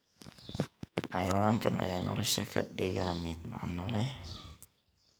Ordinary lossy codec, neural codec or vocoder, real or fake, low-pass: none; codec, 44.1 kHz, 2.6 kbps, SNAC; fake; none